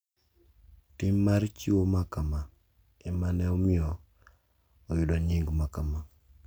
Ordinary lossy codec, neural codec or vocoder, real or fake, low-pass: none; none; real; none